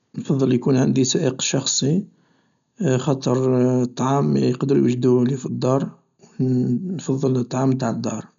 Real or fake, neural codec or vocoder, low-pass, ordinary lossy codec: real; none; 7.2 kHz; none